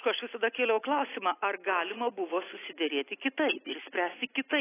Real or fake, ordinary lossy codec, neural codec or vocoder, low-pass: real; AAC, 16 kbps; none; 3.6 kHz